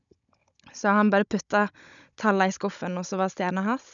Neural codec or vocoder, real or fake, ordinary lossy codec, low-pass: codec, 16 kHz, 16 kbps, FunCodec, trained on Chinese and English, 50 frames a second; fake; none; 7.2 kHz